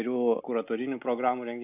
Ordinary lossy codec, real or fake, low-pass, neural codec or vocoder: AAC, 32 kbps; real; 3.6 kHz; none